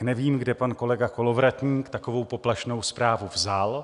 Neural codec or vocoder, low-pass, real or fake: none; 10.8 kHz; real